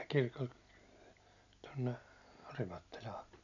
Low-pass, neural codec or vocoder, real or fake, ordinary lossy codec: 7.2 kHz; none; real; none